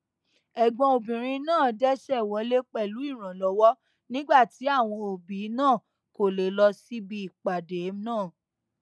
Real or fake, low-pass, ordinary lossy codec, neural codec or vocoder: real; none; none; none